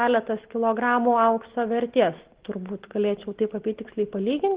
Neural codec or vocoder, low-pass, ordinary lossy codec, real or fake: none; 3.6 kHz; Opus, 16 kbps; real